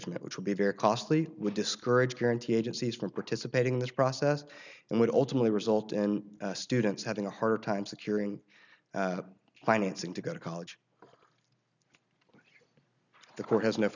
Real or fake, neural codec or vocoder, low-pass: real; none; 7.2 kHz